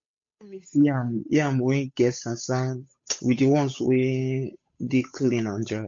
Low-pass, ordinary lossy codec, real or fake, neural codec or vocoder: 7.2 kHz; MP3, 48 kbps; fake; codec, 16 kHz, 8 kbps, FunCodec, trained on Chinese and English, 25 frames a second